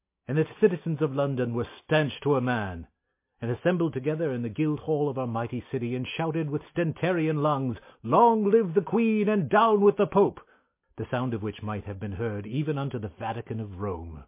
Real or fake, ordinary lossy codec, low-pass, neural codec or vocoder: real; MP3, 24 kbps; 3.6 kHz; none